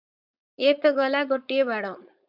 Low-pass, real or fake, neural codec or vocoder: 5.4 kHz; fake; codec, 16 kHz, 4.8 kbps, FACodec